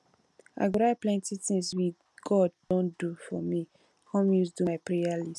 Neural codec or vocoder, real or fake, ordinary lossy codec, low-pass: none; real; none; none